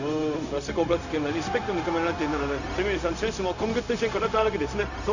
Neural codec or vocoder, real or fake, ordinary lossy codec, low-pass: codec, 16 kHz, 0.4 kbps, LongCat-Audio-Codec; fake; none; 7.2 kHz